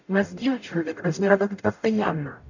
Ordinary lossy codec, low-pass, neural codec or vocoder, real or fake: none; 7.2 kHz; codec, 44.1 kHz, 0.9 kbps, DAC; fake